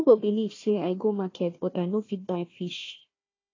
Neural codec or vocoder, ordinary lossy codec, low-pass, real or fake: codec, 16 kHz, 1 kbps, FunCodec, trained on Chinese and English, 50 frames a second; AAC, 32 kbps; 7.2 kHz; fake